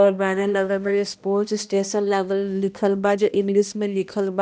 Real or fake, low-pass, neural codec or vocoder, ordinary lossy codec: fake; none; codec, 16 kHz, 1 kbps, X-Codec, HuBERT features, trained on balanced general audio; none